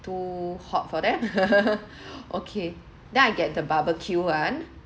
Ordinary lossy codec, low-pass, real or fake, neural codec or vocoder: none; none; real; none